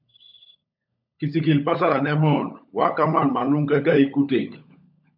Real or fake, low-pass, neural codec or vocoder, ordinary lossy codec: fake; 5.4 kHz; codec, 16 kHz, 16 kbps, FunCodec, trained on LibriTTS, 50 frames a second; MP3, 48 kbps